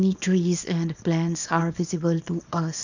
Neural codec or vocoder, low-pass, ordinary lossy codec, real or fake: codec, 24 kHz, 0.9 kbps, WavTokenizer, small release; 7.2 kHz; none; fake